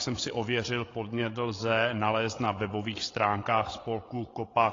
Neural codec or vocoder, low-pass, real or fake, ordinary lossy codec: codec, 16 kHz, 8 kbps, FreqCodec, larger model; 7.2 kHz; fake; AAC, 32 kbps